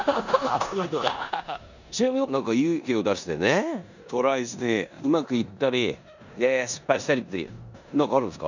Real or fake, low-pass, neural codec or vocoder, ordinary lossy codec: fake; 7.2 kHz; codec, 16 kHz in and 24 kHz out, 0.9 kbps, LongCat-Audio-Codec, four codebook decoder; none